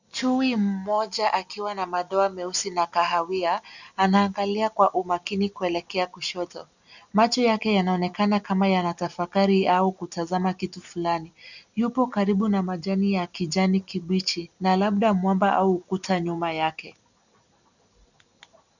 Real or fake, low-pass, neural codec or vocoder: real; 7.2 kHz; none